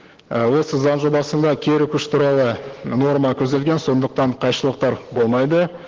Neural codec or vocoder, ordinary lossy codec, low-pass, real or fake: none; Opus, 16 kbps; 7.2 kHz; real